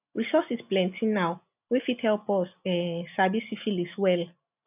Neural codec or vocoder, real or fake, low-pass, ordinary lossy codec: none; real; 3.6 kHz; none